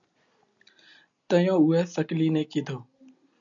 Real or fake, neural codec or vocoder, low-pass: real; none; 7.2 kHz